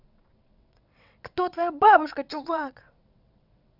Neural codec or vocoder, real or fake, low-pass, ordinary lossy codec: vocoder, 22.05 kHz, 80 mel bands, WaveNeXt; fake; 5.4 kHz; none